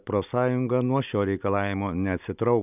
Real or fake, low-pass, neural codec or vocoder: real; 3.6 kHz; none